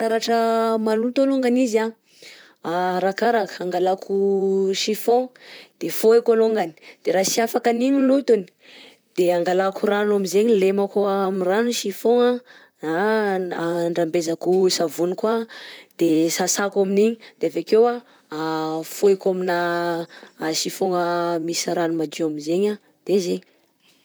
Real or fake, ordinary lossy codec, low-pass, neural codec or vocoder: fake; none; none; vocoder, 44.1 kHz, 128 mel bands, Pupu-Vocoder